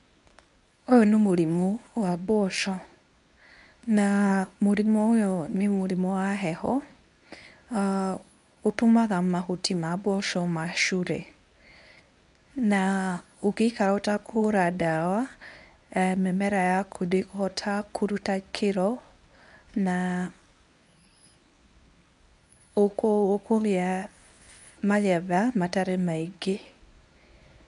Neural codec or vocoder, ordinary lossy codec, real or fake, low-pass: codec, 24 kHz, 0.9 kbps, WavTokenizer, medium speech release version 1; none; fake; 10.8 kHz